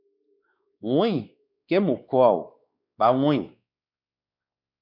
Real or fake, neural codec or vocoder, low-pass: fake; codec, 16 kHz, 2 kbps, X-Codec, WavLM features, trained on Multilingual LibriSpeech; 5.4 kHz